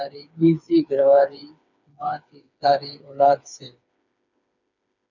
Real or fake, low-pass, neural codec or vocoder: fake; 7.2 kHz; codec, 16 kHz, 4 kbps, FreqCodec, smaller model